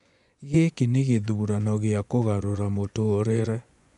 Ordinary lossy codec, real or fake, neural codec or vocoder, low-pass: none; fake; vocoder, 24 kHz, 100 mel bands, Vocos; 10.8 kHz